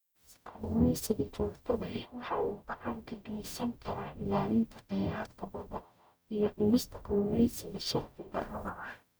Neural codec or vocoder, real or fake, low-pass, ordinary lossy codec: codec, 44.1 kHz, 0.9 kbps, DAC; fake; none; none